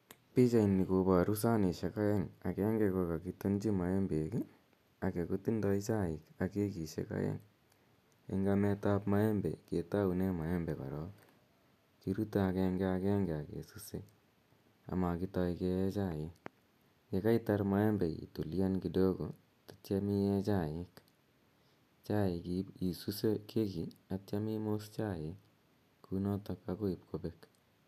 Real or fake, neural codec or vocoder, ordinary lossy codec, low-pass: real; none; none; 14.4 kHz